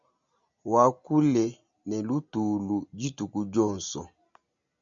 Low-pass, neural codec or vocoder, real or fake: 7.2 kHz; none; real